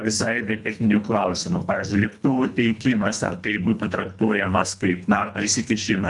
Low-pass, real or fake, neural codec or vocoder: 10.8 kHz; fake; codec, 24 kHz, 1.5 kbps, HILCodec